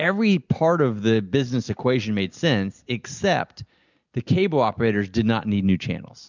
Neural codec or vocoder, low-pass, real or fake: none; 7.2 kHz; real